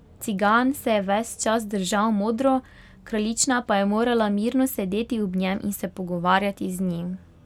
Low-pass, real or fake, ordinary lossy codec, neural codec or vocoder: 19.8 kHz; real; none; none